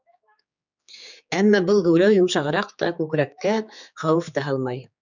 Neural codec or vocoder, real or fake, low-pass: codec, 16 kHz, 4 kbps, X-Codec, HuBERT features, trained on general audio; fake; 7.2 kHz